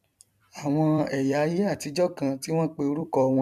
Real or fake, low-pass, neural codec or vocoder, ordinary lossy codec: fake; 14.4 kHz; vocoder, 44.1 kHz, 128 mel bands every 256 samples, BigVGAN v2; none